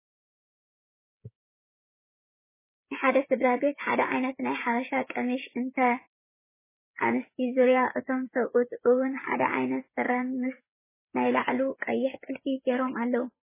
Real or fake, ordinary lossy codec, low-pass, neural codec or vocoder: fake; MP3, 16 kbps; 3.6 kHz; codec, 16 kHz, 8 kbps, FreqCodec, smaller model